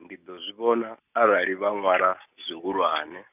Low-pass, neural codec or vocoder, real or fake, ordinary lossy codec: 3.6 kHz; none; real; none